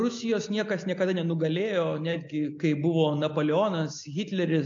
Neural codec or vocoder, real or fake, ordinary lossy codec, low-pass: none; real; AAC, 48 kbps; 7.2 kHz